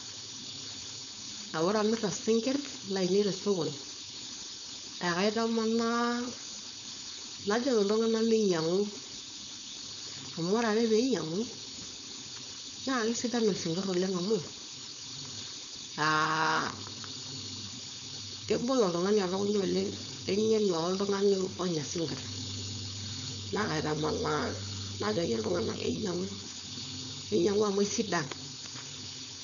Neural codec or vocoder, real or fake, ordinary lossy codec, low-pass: codec, 16 kHz, 4.8 kbps, FACodec; fake; none; 7.2 kHz